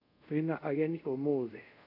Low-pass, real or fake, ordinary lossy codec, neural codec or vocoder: 5.4 kHz; fake; none; codec, 24 kHz, 0.5 kbps, DualCodec